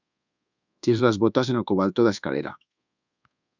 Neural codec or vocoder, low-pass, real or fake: codec, 24 kHz, 1.2 kbps, DualCodec; 7.2 kHz; fake